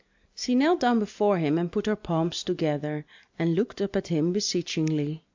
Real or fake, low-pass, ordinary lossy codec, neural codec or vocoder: real; 7.2 kHz; MP3, 64 kbps; none